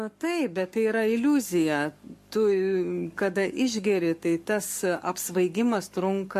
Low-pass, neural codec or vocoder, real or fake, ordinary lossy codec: 14.4 kHz; codec, 44.1 kHz, 7.8 kbps, Pupu-Codec; fake; MP3, 64 kbps